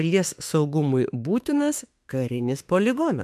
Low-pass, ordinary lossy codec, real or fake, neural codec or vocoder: 14.4 kHz; MP3, 96 kbps; fake; autoencoder, 48 kHz, 32 numbers a frame, DAC-VAE, trained on Japanese speech